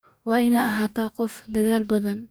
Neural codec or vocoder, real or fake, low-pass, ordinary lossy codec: codec, 44.1 kHz, 2.6 kbps, DAC; fake; none; none